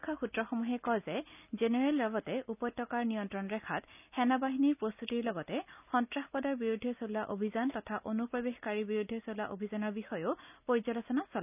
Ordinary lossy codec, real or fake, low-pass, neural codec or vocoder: none; real; 3.6 kHz; none